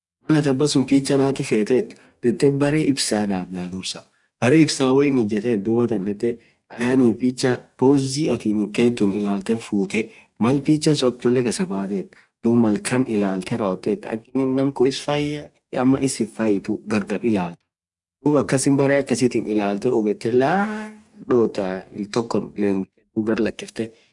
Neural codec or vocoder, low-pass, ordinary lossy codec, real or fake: codec, 44.1 kHz, 2.6 kbps, DAC; 10.8 kHz; none; fake